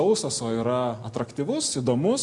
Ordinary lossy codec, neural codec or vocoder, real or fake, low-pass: MP3, 64 kbps; none; real; 10.8 kHz